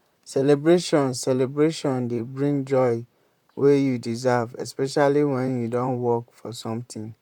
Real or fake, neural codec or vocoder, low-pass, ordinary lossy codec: fake; vocoder, 44.1 kHz, 128 mel bands, Pupu-Vocoder; 19.8 kHz; none